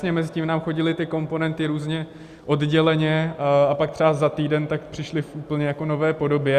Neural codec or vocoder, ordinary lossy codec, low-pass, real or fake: none; Opus, 64 kbps; 14.4 kHz; real